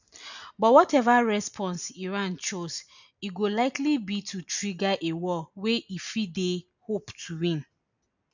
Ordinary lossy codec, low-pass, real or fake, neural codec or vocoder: none; 7.2 kHz; real; none